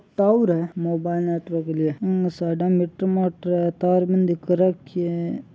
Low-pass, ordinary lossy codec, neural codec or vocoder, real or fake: none; none; none; real